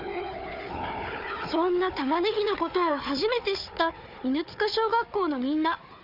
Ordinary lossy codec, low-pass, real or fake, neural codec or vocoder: none; 5.4 kHz; fake; codec, 16 kHz, 4 kbps, FunCodec, trained on Chinese and English, 50 frames a second